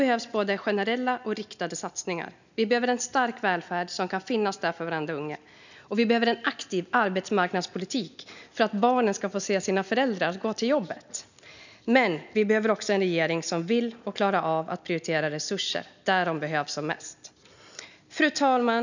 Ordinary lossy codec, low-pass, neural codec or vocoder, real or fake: none; 7.2 kHz; none; real